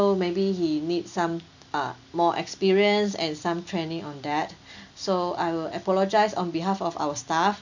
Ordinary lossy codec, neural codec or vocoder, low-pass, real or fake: none; none; 7.2 kHz; real